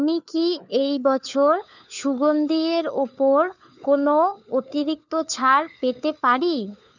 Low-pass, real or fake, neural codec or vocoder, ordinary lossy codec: 7.2 kHz; fake; codec, 16 kHz, 4 kbps, FunCodec, trained on LibriTTS, 50 frames a second; none